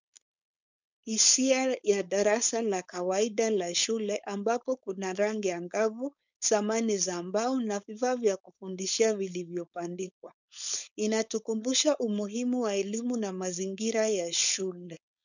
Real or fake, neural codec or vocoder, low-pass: fake; codec, 16 kHz, 4.8 kbps, FACodec; 7.2 kHz